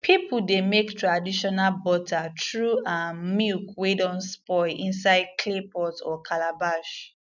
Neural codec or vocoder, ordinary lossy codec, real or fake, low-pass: none; none; real; 7.2 kHz